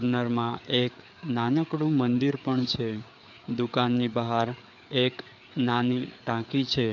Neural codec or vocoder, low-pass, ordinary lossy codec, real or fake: codec, 16 kHz, 4 kbps, FreqCodec, larger model; 7.2 kHz; none; fake